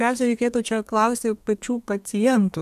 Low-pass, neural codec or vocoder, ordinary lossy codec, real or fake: 14.4 kHz; codec, 32 kHz, 1.9 kbps, SNAC; AAC, 96 kbps; fake